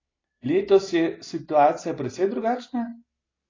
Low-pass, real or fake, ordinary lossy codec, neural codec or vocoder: 7.2 kHz; real; AAC, 32 kbps; none